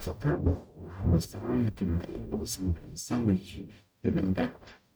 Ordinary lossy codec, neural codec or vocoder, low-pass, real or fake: none; codec, 44.1 kHz, 0.9 kbps, DAC; none; fake